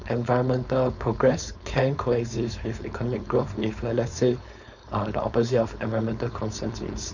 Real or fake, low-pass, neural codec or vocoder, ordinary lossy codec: fake; 7.2 kHz; codec, 16 kHz, 4.8 kbps, FACodec; none